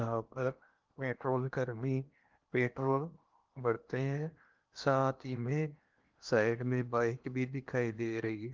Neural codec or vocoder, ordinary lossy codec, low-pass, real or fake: codec, 16 kHz in and 24 kHz out, 0.8 kbps, FocalCodec, streaming, 65536 codes; Opus, 32 kbps; 7.2 kHz; fake